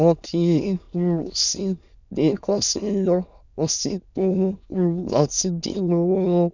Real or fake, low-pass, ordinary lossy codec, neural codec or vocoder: fake; 7.2 kHz; none; autoencoder, 22.05 kHz, a latent of 192 numbers a frame, VITS, trained on many speakers